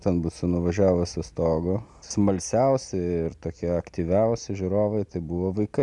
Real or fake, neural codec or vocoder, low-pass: real; none; 10.8 kHz